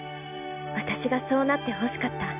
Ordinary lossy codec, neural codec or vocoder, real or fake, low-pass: none; none; real; 3.6 kHz